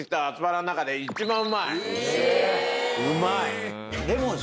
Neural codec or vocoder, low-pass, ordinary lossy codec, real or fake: none; none; none; real